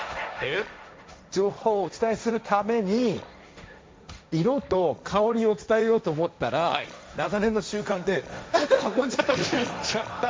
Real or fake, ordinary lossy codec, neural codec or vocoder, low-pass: fake; none; codec, 16 kHz, 1.1 kbps, Voila-Tokenizer; none